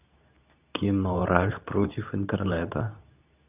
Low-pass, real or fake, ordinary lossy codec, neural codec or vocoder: 3.6 kHz; fake; none; codec, 24 kHz, 0.9 kbps, WavTokenizer, medium speech release version 2